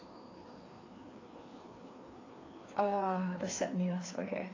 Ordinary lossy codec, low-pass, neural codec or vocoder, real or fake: AAC, 32 kbps; 7.2 kHz; codec, 16 kHz, 2 kbps, FreqCodec, larger model; fake